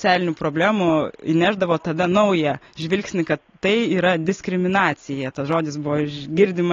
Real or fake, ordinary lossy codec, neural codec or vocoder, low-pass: real; AAC, 32 kbps; none; 7.2 kHz